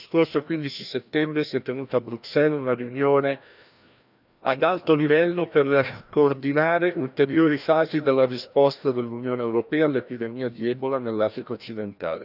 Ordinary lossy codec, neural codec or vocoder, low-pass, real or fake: none; codec, 16 kHz, 1 kbps, FreqCodec, larger model; 5.4 kHz; fake